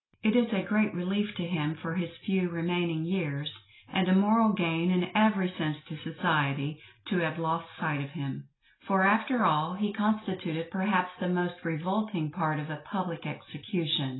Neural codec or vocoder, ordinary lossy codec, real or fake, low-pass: none; AAC, 16 kbps; real; 7.2 kHz